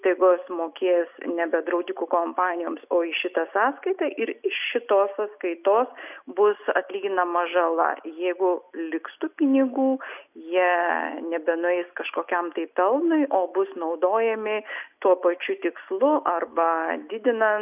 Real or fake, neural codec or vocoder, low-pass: real; none; 3.6 kHz